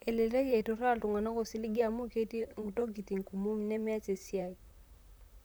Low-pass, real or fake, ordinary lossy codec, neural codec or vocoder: none; fake; none; vocoder, 44.1 kHz, 128 mel bands, Pupu-Vocoder